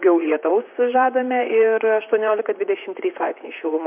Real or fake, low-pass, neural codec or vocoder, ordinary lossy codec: fake; 3.6 kHz; vocoder, 44.1 kHz, 128 mel bands, Pupu-Vocoder; MP3, 32 kbps